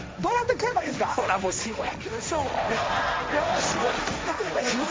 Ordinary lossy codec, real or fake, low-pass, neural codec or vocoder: none; fake; none; codec, 16 kHz, 1.1 kbps, Voila-Tokenizer